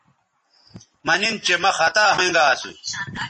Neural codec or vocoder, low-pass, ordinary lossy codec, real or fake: none; 10.8 kHz; MP3, 32 kbps; real